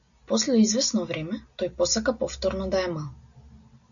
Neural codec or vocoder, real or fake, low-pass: none; real; 7.2 kHz